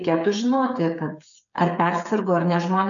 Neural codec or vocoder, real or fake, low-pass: codec, 16 kHz, 8 kbps, FreqCodec, smaller model; fake; 7.2 kHz